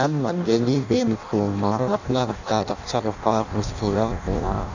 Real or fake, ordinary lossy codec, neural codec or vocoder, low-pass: fake; none; codec, 16 kHz in and 24 kHz out, 0.6 kbps, FireRedTTS-2 codec; 7.2 kHz